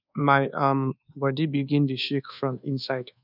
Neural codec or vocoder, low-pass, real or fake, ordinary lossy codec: codec, 24 kHz, 1.2 kbps, DualCodec; 5.4 kHz; fake; none